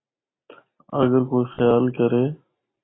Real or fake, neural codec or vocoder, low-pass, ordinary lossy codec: real; none; 7.2 kHz; AAC, 16 kbps